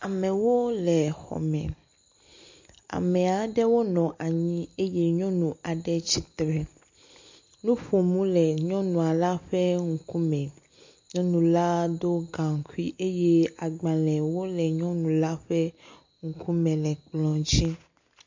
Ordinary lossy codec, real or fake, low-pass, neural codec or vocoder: MP3, 48 kbps; real; 7.2 kHz; none